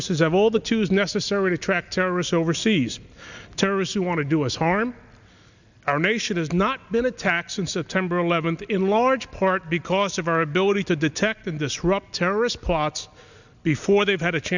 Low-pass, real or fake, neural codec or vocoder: 7.2 kHz; real; none